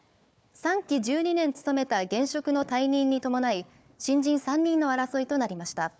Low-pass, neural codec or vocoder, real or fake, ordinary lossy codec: none; codec, 16 kHz, 4 kbps, FunCodec, trained on Chinese and English, 50 frames a second; fake; none